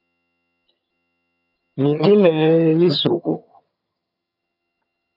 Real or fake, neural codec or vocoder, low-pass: fake; vocoder, 22.05 kHz, 80 mel bands, HiFi-GAN; 5.4 kHz